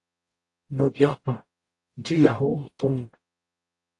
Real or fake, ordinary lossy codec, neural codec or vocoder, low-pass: fake; AAC, 48 kbps; codec, 44.1 kHz, 0.9 kbps, DAC; 10.8 kHz